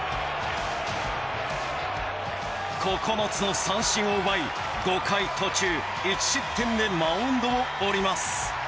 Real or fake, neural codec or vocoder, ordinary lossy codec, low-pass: real; none; none; none